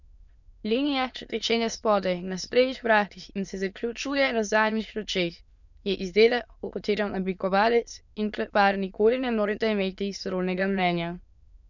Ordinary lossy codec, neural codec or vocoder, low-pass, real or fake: none; autoencoder, 22.05 kHz, a latent of 192 numbers a frame, VITS, trained on many speakers; 7.2 kHz; fake